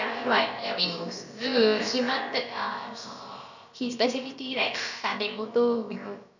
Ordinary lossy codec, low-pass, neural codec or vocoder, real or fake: none; 7.2 kHz; codec, 16 kHz, about 1 kbps, DyCAST, with the encoder's durations; fake